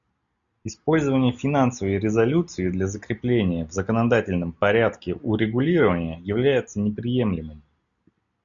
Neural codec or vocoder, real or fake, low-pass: none; real; 7.2 kHz